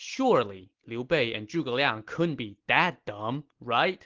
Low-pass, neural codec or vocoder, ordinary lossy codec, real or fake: 7.2 kHz; none; Opus, 16 kbps; real